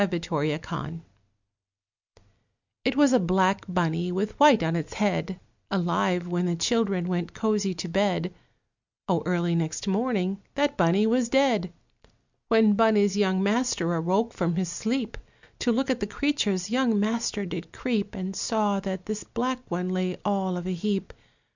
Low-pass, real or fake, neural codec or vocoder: 7.2 kHz; real; none